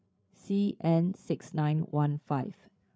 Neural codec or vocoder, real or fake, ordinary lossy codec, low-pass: codec, 16 kHz, 8 kbps, FreqCodec, larger model; fake; none; none